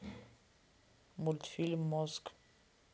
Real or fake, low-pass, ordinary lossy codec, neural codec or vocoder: real; none; none; none